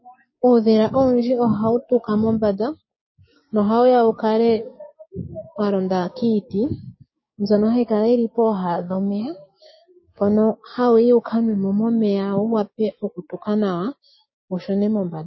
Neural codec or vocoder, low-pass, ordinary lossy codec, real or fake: codec, 16 kHz, 6 kbps, DAC; 7.2 kHz; MP3, 24 kbps; fake